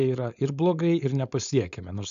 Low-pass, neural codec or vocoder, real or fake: 7.2 kHz; codec, 16 kHz, 4.8 kbps, FACodec; fake